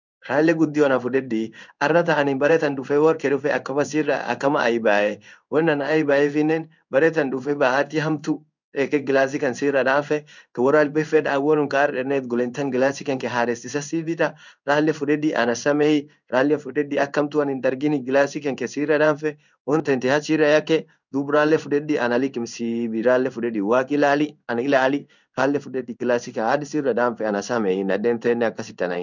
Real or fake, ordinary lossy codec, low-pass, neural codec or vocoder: fake; none; 7.2 kHz; codec, 16 kHz in and 24 kHz out, 1 kbps, XY-Tokenizer